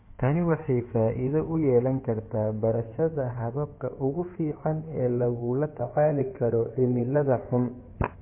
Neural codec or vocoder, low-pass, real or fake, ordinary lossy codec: codec, 16 kHz in and 24 kHz out, 2.2 kbps, FireRedTTS-2 codec; 3.6 kHz; fake; MP3, 24 kbps